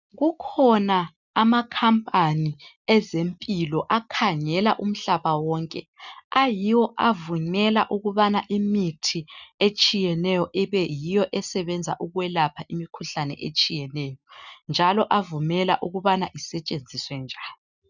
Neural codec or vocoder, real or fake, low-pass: none; real; 7.2 kHz